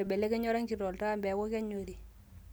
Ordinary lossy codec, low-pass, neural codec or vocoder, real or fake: none; none; none; real